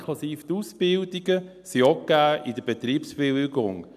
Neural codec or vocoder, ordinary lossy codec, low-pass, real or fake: none; none; 14.4 kHz; real